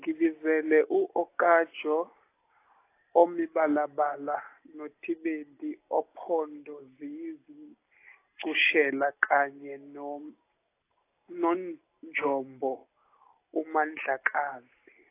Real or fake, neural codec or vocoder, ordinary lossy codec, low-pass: real; none; AAC, 24 kbps; 3.6 kHz